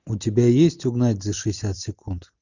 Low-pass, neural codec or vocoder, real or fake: 7.2 kHz; none; real